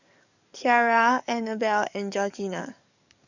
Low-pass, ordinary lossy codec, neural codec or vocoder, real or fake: 7.2 kHz; none; codec, 44.1 kHz, 7.8 kbps, DAC; fake